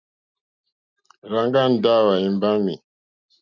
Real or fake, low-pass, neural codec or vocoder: real; 7.2 kHz; none